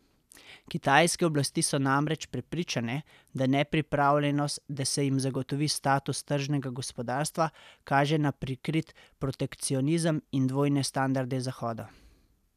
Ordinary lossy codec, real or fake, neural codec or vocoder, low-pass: none; real; none; 14.4 kHz